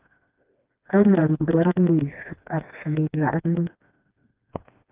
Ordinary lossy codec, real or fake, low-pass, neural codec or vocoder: Opus, 32 kbps; fake; 3.6 kHz; codec, 16 kHz, 2 kbps, FreqCodec, smaller model